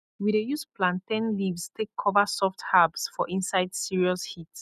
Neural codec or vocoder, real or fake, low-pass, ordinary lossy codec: none; real; 14.4 kHz; none